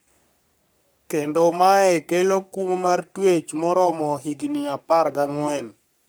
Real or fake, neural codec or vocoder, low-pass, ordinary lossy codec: fake; codec, 44.1 kHz, 3.4 kbps, Pupu-Codec; none; none